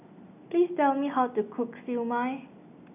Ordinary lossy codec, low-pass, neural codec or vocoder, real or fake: none; 3.6 kHz; none; real